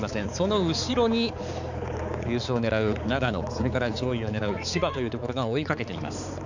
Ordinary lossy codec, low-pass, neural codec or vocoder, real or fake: none; 7.2 kHz; codec, 16 kHz, 4 kbps, X-Codec, HuBERT features, trained on balanced general audio; fake